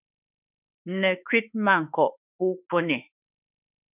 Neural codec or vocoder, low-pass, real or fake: autoencoder, 48 kHz, 32 numbers a frame, DAC-VAE, trained on Japanese speech; 3.6 kHz; fake